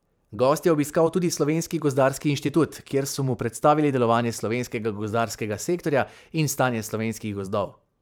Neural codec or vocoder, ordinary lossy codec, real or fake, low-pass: vocoder, 44.1 kHz, 128 mel bands every 512 samples, BigVGAN v2; none; fake; none